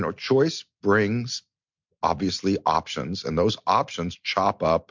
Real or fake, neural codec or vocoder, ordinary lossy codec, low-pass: real; none; MP3, 64 kbps; 7.2 kHz